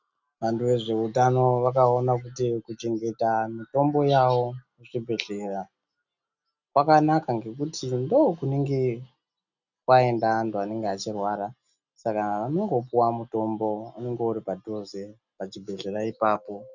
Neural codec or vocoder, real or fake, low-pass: none; real; 7.2 kHz